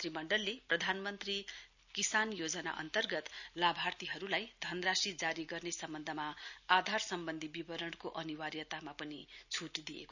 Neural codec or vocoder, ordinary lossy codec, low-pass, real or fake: none; none; 7.2 kHz; real